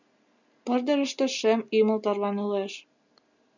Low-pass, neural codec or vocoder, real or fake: 7.2 kHz; none; real